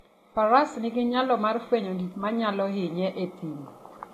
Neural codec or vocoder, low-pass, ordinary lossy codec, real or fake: none; 14.4 kHz; AAC, 48 kbps; real